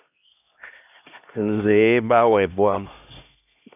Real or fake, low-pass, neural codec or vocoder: fake; 3.6 kHz; codec, 16 kHz, 1 kbps, X-Codec, HuBERT features, trained on LibriSpeech